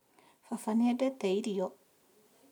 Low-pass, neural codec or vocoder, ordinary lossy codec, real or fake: 19.8 kHz; none; none; real